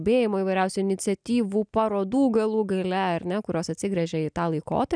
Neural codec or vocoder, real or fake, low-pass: none; real; 9.9 kHz